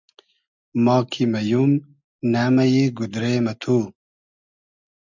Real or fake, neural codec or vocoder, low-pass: real; none; 7.2 kHz